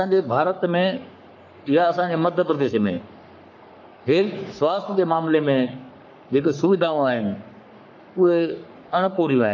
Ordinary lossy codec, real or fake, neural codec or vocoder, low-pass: MP3, 64 kbps; fake; codec, 44.1 kHz, 3.4 kbps, Pupu-Codec; 7.2 kHz